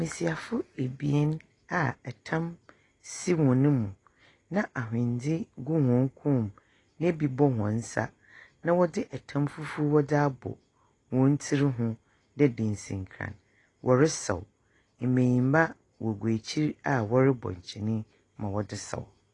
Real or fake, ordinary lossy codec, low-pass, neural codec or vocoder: real; AAC, 32 kbps; 10.8 kHz; none